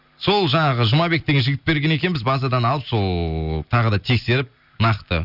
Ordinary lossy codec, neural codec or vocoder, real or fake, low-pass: none; none; real; 5.4 kHz